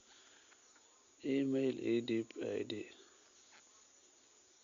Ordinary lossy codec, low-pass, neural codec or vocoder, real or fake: Opus, 64 kbps; 7.2 kHz; none; real